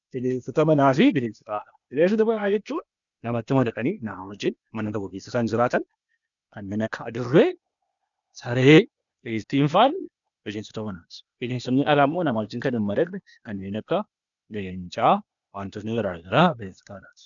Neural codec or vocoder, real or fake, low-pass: codec, 16 kHz, 0.8 kbps, ZipCodec; fake; 7.2 kHz